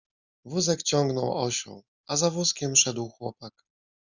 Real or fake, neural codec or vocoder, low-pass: real; none; 7.2 kHz